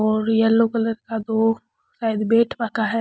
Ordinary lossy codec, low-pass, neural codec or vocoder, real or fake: none; none; none; real